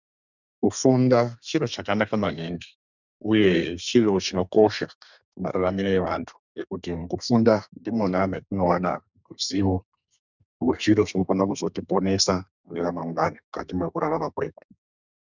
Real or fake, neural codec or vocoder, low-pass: fake; codec, 32 kHz, 1.9 kbps, SNAC; 7.2 kHz